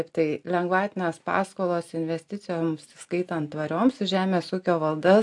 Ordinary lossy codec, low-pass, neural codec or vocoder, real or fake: AAC, 64 kbps; 10.8 kHz; none; real